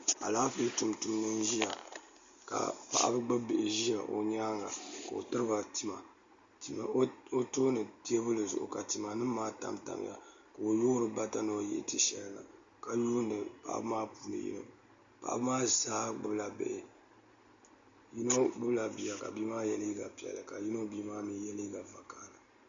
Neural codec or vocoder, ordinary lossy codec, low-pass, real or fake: none; Opus, 64 kbps; 7.2 kHz; real